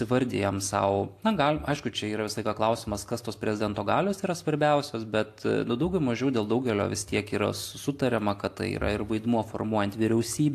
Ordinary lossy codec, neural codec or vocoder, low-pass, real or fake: AAC, 64 kbps; none; 14.4 kHz; real